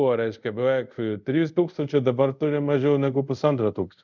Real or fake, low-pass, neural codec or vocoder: fake; 7.2 kHz; codec, 24 kHz, 0.5 kbps, DualCodec